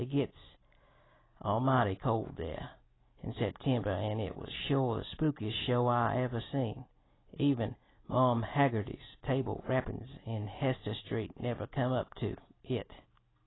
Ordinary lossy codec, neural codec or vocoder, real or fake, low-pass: AAC, 16 kbps; none; real; 7.2 kHz